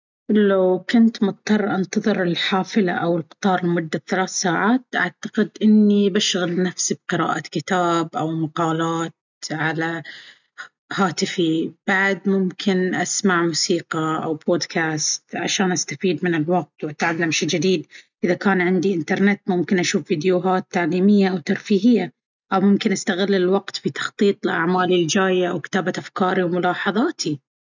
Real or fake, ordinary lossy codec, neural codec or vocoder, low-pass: real; none; none; 7.2 kHz